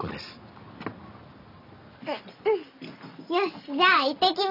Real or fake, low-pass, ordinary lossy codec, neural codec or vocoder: real; 5.4 kHz; none; none